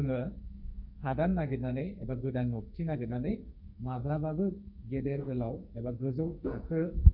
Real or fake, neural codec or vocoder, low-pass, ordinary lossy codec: fake; codec, 44.1 kHz, 2.6 kbps, SNAC; 5.4 kHz; none